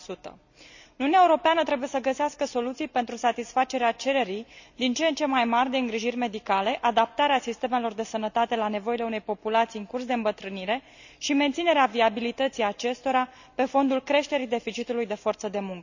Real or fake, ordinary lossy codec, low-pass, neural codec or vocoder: real; none; 7.2 kHz; none